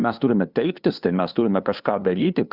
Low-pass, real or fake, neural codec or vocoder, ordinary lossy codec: 5.4 kHz; fake; codec, 16 kHz, 2 kbps, FunCodec, trained on LibriTTS, 25 frames a second; Opus, 64 kbps